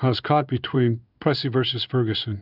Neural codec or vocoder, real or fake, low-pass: codec, 16 kHz in and 24 kHz out, 1 kbps, XY-Tokenizer; fake; 5.4 kHz